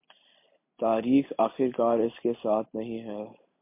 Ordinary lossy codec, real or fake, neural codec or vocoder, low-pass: MP3, 24 kbps; real; none; 3.6 kHz